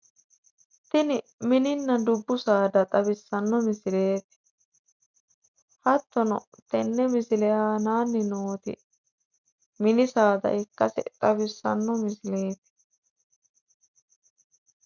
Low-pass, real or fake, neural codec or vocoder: 7.2 kHz; real; none